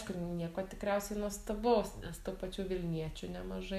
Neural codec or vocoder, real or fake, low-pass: none; real; 14.4 kHz